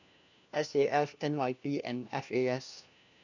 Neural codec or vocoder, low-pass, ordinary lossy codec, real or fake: codec, 16 kHz, 1 kbps, FunCodec, trained on LibriTTS, 50 frames a second; 7.2 kHz; none; fake